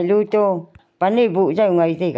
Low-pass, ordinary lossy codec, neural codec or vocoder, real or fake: none; none; none; real